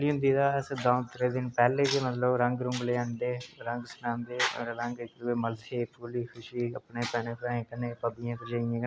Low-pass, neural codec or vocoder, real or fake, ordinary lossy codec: none; none; real; none